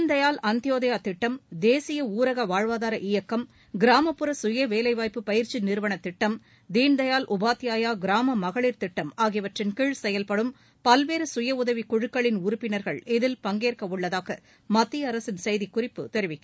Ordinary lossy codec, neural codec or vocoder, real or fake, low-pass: none; none; real; none